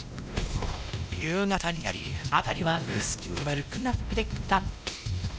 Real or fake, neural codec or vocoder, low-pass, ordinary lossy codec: fake; codec, 16 kHz, 1 kbps, X-Codec, WavLM features, trained on Multilingual LibriSpeech; none; none